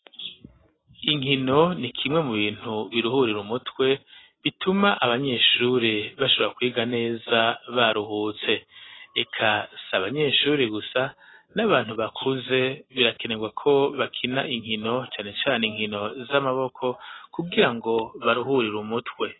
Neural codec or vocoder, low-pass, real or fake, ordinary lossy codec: none; 7.2 kHz; real; AAC, 16 kbps